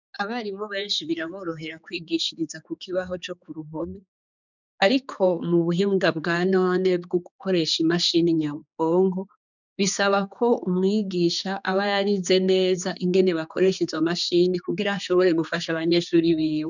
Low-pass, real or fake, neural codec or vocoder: 7.2 kHz; fake; codec, 16 kHz, 4 kbps, X-Codec, HuBERT features, trained on general audio